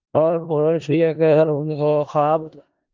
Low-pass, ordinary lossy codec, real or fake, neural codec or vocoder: 7.2 kHz; Opus, 24 kbps; fake; codec, 16 kHz in and 24 kHz out, 0.4 kbps, LongCat-Audio-Codec, four codebook decoder